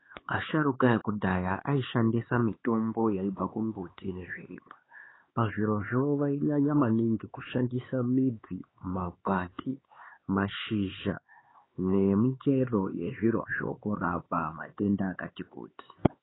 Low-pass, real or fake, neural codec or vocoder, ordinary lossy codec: 7.2 kHz; fake; codec, 16 kHz, 4 kbps, X-Codec, HuBERT features, trained on LibriSpeech; AAC, 16 kbps